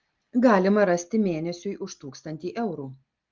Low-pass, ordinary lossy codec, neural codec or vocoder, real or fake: 7.2 kHz; Opus, 24 kbps; none; real